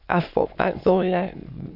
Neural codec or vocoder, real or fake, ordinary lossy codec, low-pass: autoencoder, 22.05 kHz, a latent of 192 numbers a frame, VITS, trained on many speakers; fake; Opus, 64 kbps; 5.4 kHz